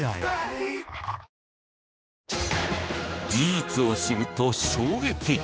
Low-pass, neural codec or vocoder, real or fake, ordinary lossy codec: none; codec, 16 kHz, 2 kbps, X-Codec, HuBERT features, trained on general audio; fake; none